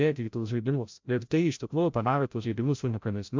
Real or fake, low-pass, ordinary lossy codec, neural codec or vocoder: fake; 7.2 kHz; MP3, 64 kbps; codec, 16 kHz, 0.5 kbps, FreqCodec, larger model